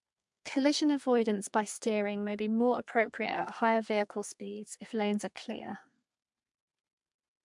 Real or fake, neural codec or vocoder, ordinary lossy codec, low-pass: fake; codec, 32 kHz, 1.9 kbps, SNAC; MP3, 64 kbps; 10.8 kHz